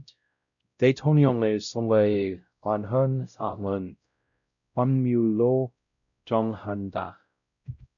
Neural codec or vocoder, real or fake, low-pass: codec, 16 kHz, 0.5 kbps, X-Codec, WavLM features, trained on Multilingual LibriSpeech; fake; 7.2 kHz